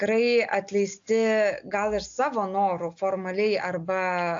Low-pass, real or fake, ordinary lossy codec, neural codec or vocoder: 7.2 kHz; real; AAC, 64 kbps; none